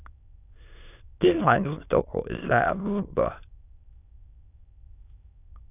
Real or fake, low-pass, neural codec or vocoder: fake; 3.6 kHz; autoencoder, 22.05 kHz, a latent of 192 numbers a frame, VITS, trained on many speakers